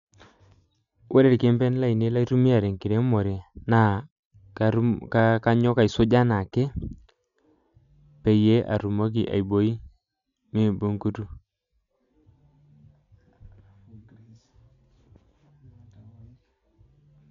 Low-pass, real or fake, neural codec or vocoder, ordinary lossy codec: 7.2 kHz; real; none; MP3, 96 kbps